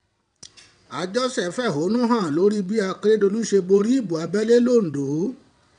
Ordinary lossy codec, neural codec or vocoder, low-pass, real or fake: none; vocoder, 22.05 kHz, 80 mel bands, WaveNeXt; 9.9 kHz; fake